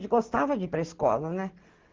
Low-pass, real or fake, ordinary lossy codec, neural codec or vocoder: 7.2 kHz; real; Opus, 16 kbps; none